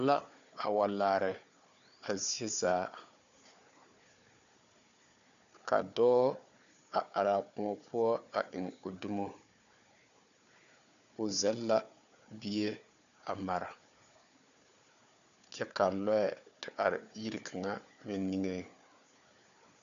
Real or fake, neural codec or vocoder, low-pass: fake; codec, 16 kHz, 4 kbps, FunCodec, trained on Chinese and English, 50 frames a second; 7.2 kHz